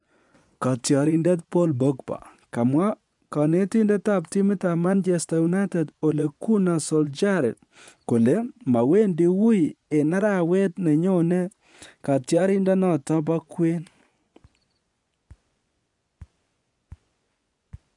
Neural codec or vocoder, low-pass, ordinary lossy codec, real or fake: vocoder, 24 kHz, 100 mel bands, Vocos; 10.8 kHz; none; fake